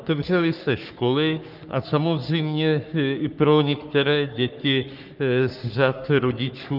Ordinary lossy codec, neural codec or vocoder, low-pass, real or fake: Opus, 24 kbps; codec, 44.1 kHz, 3.4 kbps, Pupu-Codec; 5.4 kHz; fake